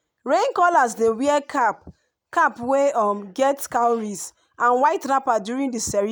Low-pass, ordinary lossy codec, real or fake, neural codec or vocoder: none; none; real; none